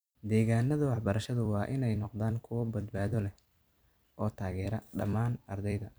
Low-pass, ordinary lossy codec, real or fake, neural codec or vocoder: none; none; fake; vocoder, 44.1 kHz, 128 mel bands every 256 samples, BigVGAN v2